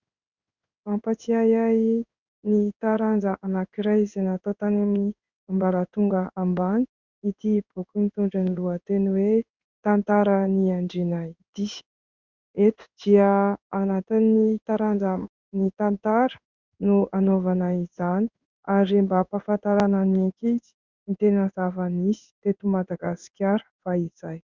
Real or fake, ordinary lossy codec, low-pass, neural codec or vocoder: fake; Opus, 64 kbps; 7.2 kHz; codec, 16 kHz in and 24 kHz out, 1 kbps, XY-Tokenizer